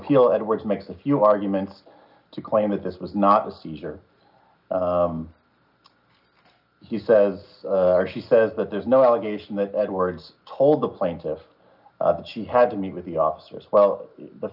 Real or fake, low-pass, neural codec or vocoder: real; 5.4 kHz; none